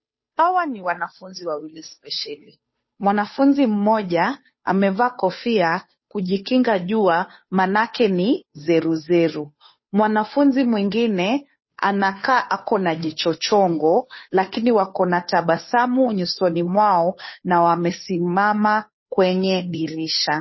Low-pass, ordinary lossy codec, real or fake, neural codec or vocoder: 7.2 kHz; MP3, 24 kbps; fake; codec, 16 kHz, 2 kbps, FunCodec, trained on Chinese and English, 25 frames a second